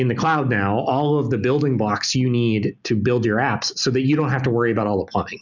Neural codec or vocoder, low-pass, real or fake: none; 7.2 kHz; real